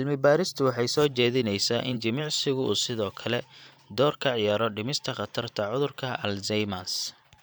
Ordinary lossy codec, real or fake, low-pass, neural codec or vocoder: none; fake; none; vocoder, 44.1 kHz, 128 mel bands every 512 samples, BigVGAN v2